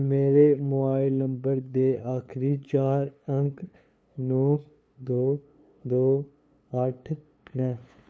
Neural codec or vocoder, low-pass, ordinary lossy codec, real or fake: codec, 16 kHz, 2 kbps, FunCodec, trained on LibriTTS, 25 frames a second; none; none; fake